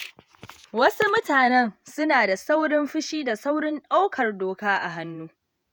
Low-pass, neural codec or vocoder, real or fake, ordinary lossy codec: none; vocoder, 48 kHz, 128 mel bands, Vocos; fake; none